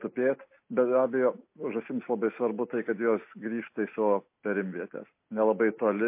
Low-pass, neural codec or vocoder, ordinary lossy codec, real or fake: 3.6 kHz; none; MP3, 24 kbps; real